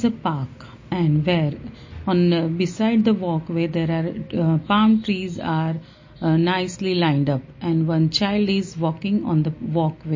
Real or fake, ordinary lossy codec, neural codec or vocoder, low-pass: real; MP3, 32 kbps; none; 7.2 kHz